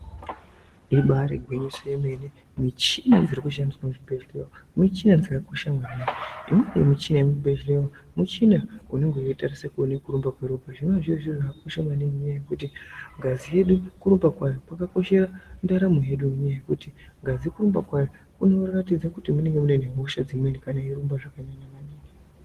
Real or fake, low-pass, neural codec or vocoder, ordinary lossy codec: real; 14.4 kHz; none; Opus, 16 kbps